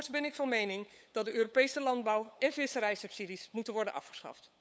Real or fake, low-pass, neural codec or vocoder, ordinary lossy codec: fake; none; codec, 16 kHz, 8 kbps, FunCodec, trained on LibriTTS, 25 frames a second; none